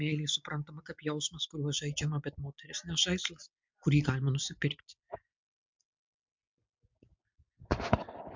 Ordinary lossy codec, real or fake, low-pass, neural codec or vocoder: MP3, 64 kbps; real; 7.2 kHz; none